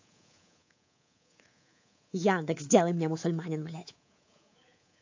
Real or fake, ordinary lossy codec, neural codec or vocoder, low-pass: fake; AAC, 48 kbps; codec, 24 kHz, 3.1 kbps, DualCodec; 7.2 kHz